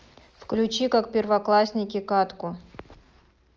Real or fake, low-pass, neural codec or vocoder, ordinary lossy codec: real; 7.2 kHz; none; Opus, 24 kbps